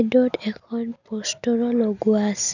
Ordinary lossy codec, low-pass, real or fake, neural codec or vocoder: none; 7.2 kHz; real; none